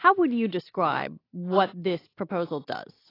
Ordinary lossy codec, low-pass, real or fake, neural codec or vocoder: AAC, 24 kbps; 5.4 kHz; real; none